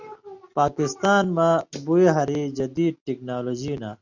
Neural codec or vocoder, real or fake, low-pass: none; real; 7.2 kHz